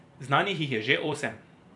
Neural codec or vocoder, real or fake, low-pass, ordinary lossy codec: vocoder, 44.1 kHz, 128 mel bands every 256 samples, BigVGAN v2; fake; 10.8 kHz; none